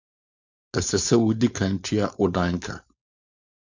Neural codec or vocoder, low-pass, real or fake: codec, 16 kHz, 4.8 kbps, FACodec; 7.2 kHz; fake